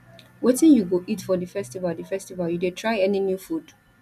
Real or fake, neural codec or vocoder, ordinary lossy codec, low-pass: real; none; none; 14.4 kHz